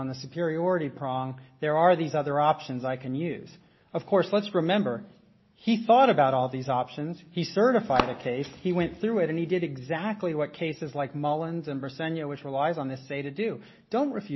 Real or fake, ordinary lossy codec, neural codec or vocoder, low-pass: real; MP3, 24 kbps; none; 7.2 kHz